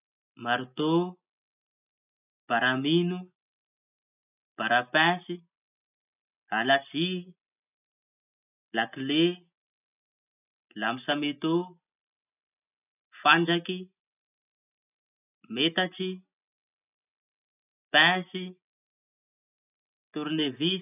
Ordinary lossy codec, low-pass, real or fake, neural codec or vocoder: none; 3.6 kHz; real; none